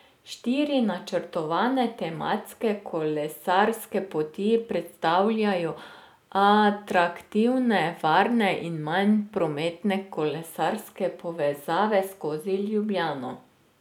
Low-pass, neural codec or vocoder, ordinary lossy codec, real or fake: 19.8 kHz; none; none; real